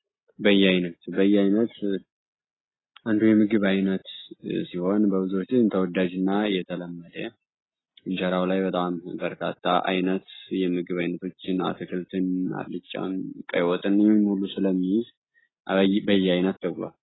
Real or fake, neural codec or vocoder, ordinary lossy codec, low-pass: real; none; AAC, 16 kbps; 7.2 kHz